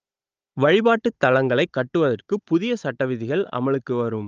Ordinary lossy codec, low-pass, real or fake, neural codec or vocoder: Opus, 24 kbps; 7.2 kHz; fake; codec, 16 kHz, 16 kbps, FunCodec, trained on Chinese and English, 50 frames a second